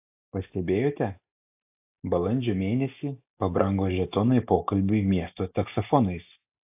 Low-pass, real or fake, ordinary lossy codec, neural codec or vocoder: 3.6 kHz; real; AAC, 32 kbps; none